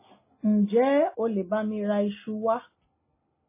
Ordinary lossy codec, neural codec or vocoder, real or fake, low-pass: MP3, 16 kbps; none; real; 3.6 kHz